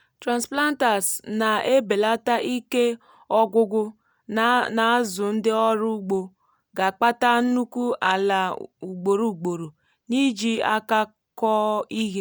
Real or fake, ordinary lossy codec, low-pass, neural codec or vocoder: real; none; none; none